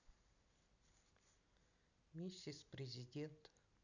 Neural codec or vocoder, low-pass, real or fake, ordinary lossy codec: none; 7.2 kHz; real; none